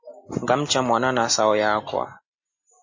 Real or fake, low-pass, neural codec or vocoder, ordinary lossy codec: real; 7.2 kHz; none; MP3, 32 kbps